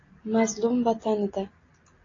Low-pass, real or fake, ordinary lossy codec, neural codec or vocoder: 7.2 kHz; real; AAC, 32 kbps; none